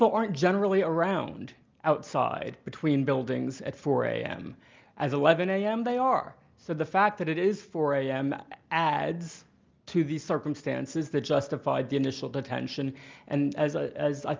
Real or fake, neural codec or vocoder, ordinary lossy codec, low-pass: real; none; Opus, 24 kbps; 7.2 kHz